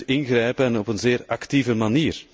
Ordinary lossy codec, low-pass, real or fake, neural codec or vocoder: none; none; real; none